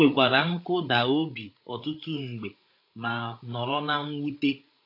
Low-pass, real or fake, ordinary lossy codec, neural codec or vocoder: 5.4 kHz; fake; AAC, 32 kbps; codec, 16 kHz, 8 kbps, FreqCodec, larger model